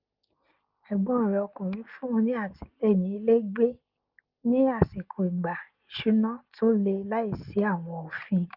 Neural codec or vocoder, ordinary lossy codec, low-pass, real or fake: none; Opus, 24 kbps; 5.4 kHz; real